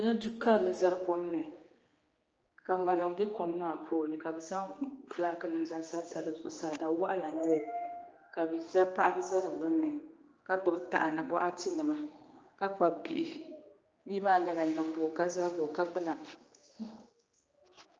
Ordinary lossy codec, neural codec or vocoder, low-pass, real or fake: Opus, 24 kbps; codec, 16 kHz, 2 kbps, X-Codec, HuBERT features, trained on general audio; 7.2 kHz; fake